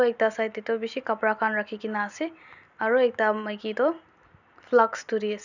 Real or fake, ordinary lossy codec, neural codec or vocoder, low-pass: real; none; none; 7.2 kHz